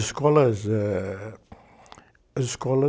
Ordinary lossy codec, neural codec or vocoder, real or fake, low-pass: none; none; real; none